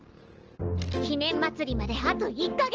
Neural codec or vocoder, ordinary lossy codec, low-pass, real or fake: none; Opus, 16 kbps; 7.2 kHz; real